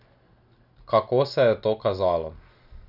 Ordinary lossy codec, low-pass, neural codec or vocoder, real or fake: none; 5.4 kHz; none; real